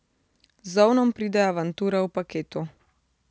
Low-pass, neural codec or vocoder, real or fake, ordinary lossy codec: none; none; real; none